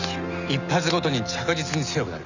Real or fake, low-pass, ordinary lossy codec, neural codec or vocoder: real; 7.2 kHz; none; none